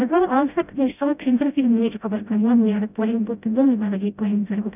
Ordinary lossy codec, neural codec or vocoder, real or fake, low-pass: none; codec, 16 kHz, 0.5 kbps, FreqCodec, smaller model; fake; 3.6 kHz